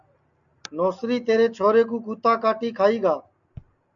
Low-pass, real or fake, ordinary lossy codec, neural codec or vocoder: 7.2 kHz; real; MP3, 64 kbps; none